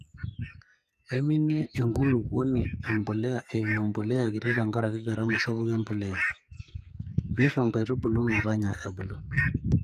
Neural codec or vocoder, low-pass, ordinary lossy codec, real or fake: codec, 44.1 kHz, 2.6 kbps, SNAC; 14.4 kHz; none; fake